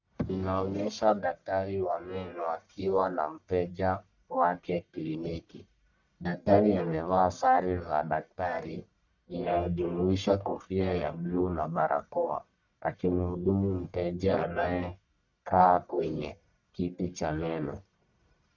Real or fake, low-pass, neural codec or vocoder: fake; 7.2 kHz; codec, 44.1 kHz, 1.7 kbps, Pupu-Codec